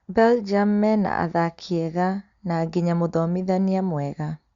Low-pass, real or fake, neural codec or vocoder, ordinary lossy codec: 7.2 kHz; real; none; Opus, 64 kbps